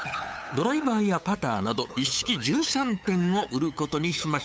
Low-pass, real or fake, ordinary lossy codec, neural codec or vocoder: none; fake; none; codec, 16 kHz, 8 kbps, FunCodec, trained on LibriTTS, 25 frames a second